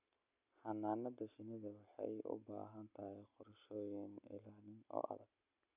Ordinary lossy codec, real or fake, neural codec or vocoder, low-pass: none; real; none; 3.6 kHz